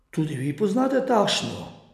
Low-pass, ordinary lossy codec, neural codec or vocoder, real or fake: 14.4 kHz; none; none; real